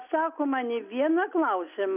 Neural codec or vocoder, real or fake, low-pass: none; real; 3.6 kHz